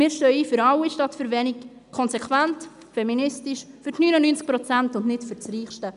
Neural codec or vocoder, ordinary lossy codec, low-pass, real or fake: none; none; 10.8 kHz; real